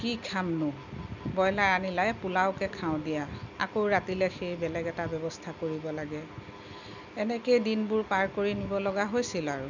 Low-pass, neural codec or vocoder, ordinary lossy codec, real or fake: 7.2 kHz; none; none; real